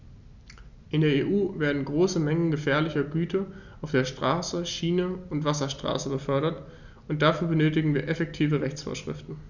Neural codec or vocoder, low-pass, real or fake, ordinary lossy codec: none; 7.2 kHz; real; none